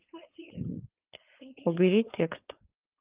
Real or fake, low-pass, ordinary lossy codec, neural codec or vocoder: fake; 3.6 kHz; Opus, 32 kbps; codec, 16 kHz, 4.8 kbps, FACodec